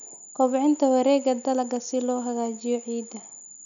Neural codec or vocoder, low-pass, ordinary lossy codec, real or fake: none; 7.2 kHz; none; real